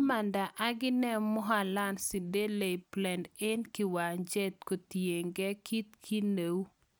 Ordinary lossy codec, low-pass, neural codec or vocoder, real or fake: none; none; none; real